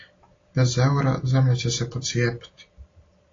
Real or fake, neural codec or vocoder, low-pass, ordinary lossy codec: real; none; 7.2 kHz; AAC, 32 kbps